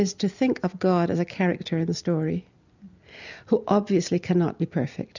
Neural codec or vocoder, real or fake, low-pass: none; real; 7.2 kHz